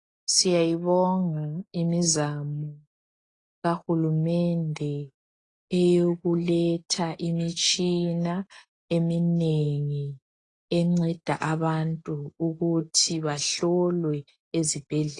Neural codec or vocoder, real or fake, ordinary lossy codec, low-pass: none; real; AAC, 32 kbps; 10.8 kHz